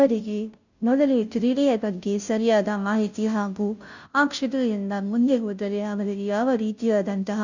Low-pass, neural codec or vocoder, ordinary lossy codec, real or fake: 7.2 kHz; codec, 16 kHz, 0.5 kbps, FunCodec, trained on Chinese and English, 25 frames a second; none; fake